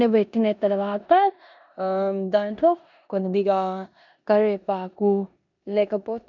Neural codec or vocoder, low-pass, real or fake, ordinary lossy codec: codec, 16 kHz in and 24 kHz out, 0.9 kbps, LongCat-Audio-Codec, four codebook decoder; 7.2 kHz; fake; none